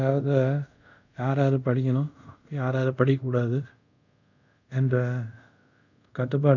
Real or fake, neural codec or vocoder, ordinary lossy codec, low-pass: fake; codec, 24 kHz, 0.5 kbps, DualCodec; none; 7.2 kHz